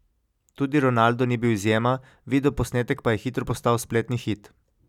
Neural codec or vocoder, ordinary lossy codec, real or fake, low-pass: none; none; real; 19.8 kHz